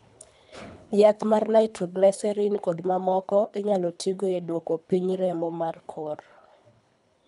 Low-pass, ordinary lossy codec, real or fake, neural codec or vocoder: 10.8 kHz; none; fake; codec, 24 kHz, 3 kbps, HILCodec